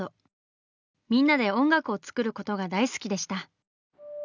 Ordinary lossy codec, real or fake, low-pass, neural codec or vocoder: none; real; 7.2 kHz; none